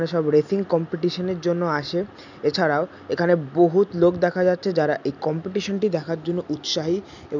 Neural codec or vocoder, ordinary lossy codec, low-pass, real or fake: none; none; 7.2 kHz; real